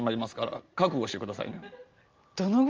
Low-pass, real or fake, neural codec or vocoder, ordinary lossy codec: 7.2 kHz; real; none; Opus, 24 kbps